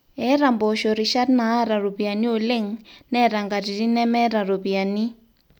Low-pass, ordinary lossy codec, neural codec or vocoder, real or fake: none; none; none; real